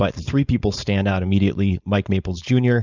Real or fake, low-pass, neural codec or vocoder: fake; 7.2 kHz; codec, 16 kHz, 4.8 kbps, FACodec